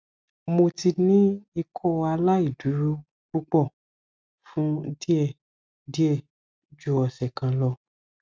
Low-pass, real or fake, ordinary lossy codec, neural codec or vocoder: none; real; none; none